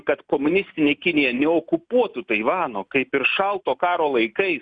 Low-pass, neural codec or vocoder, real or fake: 9.9 kHz; none; real